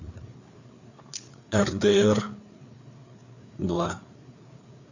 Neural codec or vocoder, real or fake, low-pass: codec, 16 kHz, 4 kbps, FreqCodec, larger model; fake; 7.2 kHz